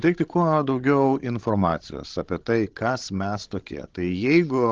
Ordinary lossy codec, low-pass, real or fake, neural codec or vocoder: Opus, 16 kbps; 7.2 kHz; fake; codec, 16 kHz, 16 kbps, FreqCodec, larger model